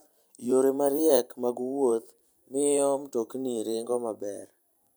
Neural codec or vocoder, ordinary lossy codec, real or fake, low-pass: vocoder, 44.1 kHz, 128 mel bands every 512 samples, BigVGAN v2; none; fake; none